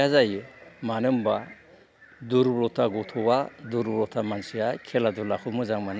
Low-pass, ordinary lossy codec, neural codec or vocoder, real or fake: none; none; none; real